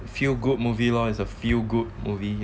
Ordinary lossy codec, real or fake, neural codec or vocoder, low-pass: none; real; none; none